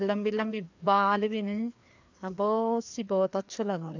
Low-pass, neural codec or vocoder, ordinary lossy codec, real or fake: 7.2 kHz; codec, 16 kHz, 2 kbps, FreqCodec, larger model; AAC, 48 kbps; fake